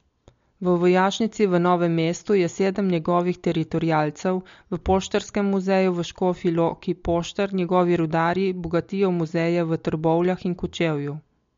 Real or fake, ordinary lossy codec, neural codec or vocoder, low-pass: real; MP3, 48 kbps; none; 7.2 kHz